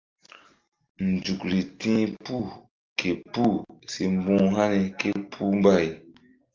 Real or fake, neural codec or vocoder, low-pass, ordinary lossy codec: real; none; 7.2 kHz; Opus, 32 kbps